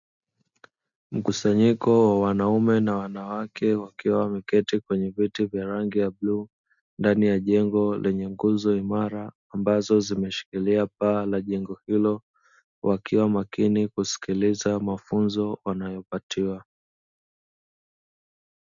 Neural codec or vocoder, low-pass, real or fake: none; 7.2 kHz; real